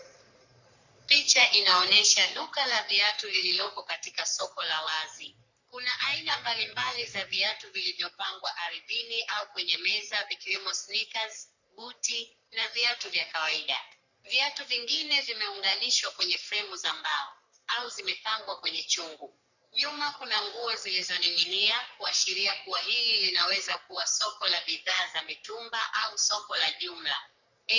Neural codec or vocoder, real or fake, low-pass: codec, 44.1 kHz, 3.4 kbps, Pupu-Codec; fake; 7.2 kHz